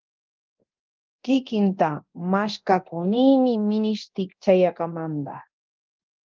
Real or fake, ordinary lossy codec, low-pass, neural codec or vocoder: fake; Opus, 32 kbps; 7.2 kHz; codec, 24 kHz, 0.9 kbps, DualCodec